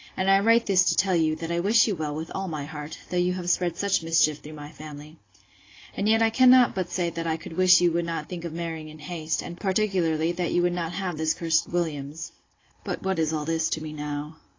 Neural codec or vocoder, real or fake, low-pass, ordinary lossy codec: none; real; 7.2 kHz; AAC, 32 kbps